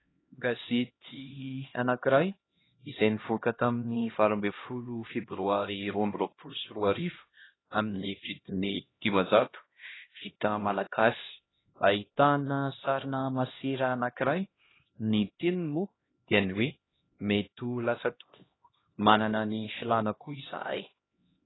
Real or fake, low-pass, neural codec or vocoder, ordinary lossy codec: fake; 7.2 kHz; codec, 16 kHz, 1 kbps, X-Codec, HuBERT features, trained on LibriSpeech; AAC, 16 kbps